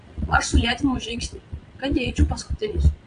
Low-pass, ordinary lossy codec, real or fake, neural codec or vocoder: 9.9 kHz; AAC, 64 kbps; fake; vocoder, 22.05 kHz, 80 mel bands, WaveNeXt